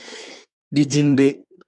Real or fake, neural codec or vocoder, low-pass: fake; codec, 24 kHz, 1 kbps, SNAC; 10.8 kHz